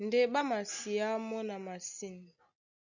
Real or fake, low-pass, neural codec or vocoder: real; 7.2 kHz; none